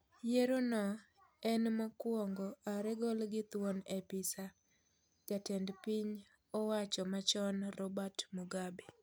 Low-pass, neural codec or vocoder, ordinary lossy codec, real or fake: none; none; none; real